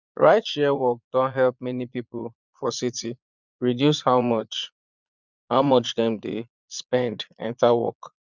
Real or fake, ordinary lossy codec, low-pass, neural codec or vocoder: fake; none; 7.2 kHz; vocoder, 22.05 kHz, 80 mel bands, Vocos